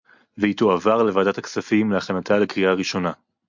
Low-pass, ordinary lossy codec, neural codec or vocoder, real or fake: 7.2 kHz; MP3, 64 kbps; none; real